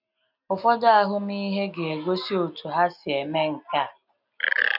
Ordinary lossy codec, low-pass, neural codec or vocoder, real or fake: none; 5.4 kHz; none; real